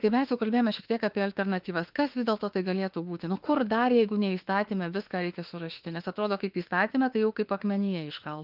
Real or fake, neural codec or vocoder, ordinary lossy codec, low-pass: fake; autoencoder, 48 kHz, 32 numbers a frame, DAC-VAE, trained on Japanese speech; Opus, 32 kbps; 5.4 kHz